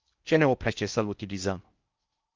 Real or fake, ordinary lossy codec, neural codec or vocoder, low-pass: fake; Opus, 32 kbps; codec, 16 kHz in and 24 kHz out, 0.6 kbps, FocalCodec, streaming, 4096 codes; 7.2 kHz